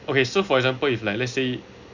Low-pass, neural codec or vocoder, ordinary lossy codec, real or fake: 7.2 kHz; none; none; real